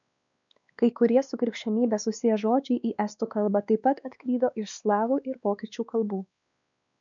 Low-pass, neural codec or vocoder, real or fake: 7.2 kHz; codec, 16 kHz, 2 kbps, X-Codec, WavLM features, trained on Multilingual LibriSpeech; fake